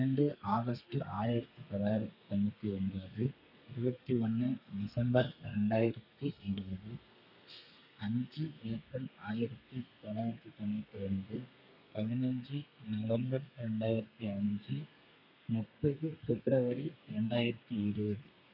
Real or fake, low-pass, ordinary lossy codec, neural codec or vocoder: fake; 5.4 kHz; none; codec, 32 kHz, 1.9 kbps, SNAC